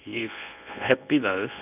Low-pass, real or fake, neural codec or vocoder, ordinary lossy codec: 3.6 kHz; fake; codec, 24 kHz, 0.9 kbps, WavTokenizer, medium speech release version 1; none